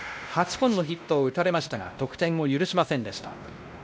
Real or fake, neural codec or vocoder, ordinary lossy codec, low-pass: fake; codec, 16 kHz, 1 kbps, X-Codec, WavLM features, trained on Multilingual LibriSpeech; none; none